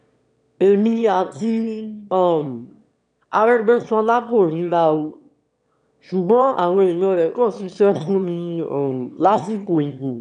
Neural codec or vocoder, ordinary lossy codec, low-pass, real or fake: autoencoder, 22.05 kHz, a latent of 192 numbers a frame, VITS, trained on one speaker; none; 9.9 kHz; fake